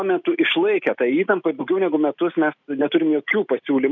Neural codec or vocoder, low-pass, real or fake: none; 7.2 kHz; real